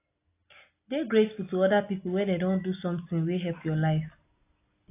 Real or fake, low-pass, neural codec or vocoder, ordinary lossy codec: real; 3.6 kHz; none; AAC, 24 kbps